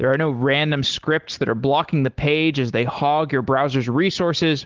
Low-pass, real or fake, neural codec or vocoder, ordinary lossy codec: 7.2 kHz; real; none; Opus, 16 kbps